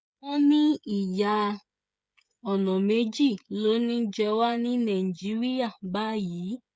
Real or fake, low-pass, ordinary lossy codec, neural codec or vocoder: fake; none; none; codec, 16 kHz, 16 kbps, FreqCodec, smaller model